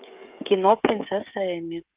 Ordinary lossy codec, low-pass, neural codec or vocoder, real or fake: Opus, 32 kbps; 3.6 kHz; codec, 16 kHz, 16 kbps, FreqCodec, smaller model; fake